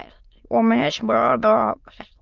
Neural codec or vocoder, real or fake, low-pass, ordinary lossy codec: autoencoder, 22.05 kHz, a latent of 192 numbers a frame, VITS, trained on many speakers; fake; 7.2 kHz; Opus, 24 kbps